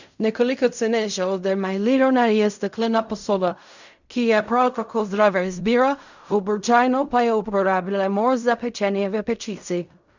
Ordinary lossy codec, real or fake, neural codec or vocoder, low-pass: none; fake; codec, 16 kHz in and 24 kHz out, 0.4 kbps, LongCat-Audio-Codec, fine tuned four codebook decoder; 7.2 kHz